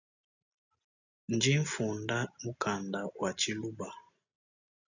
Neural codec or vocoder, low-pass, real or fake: none; 7.2 kHz; real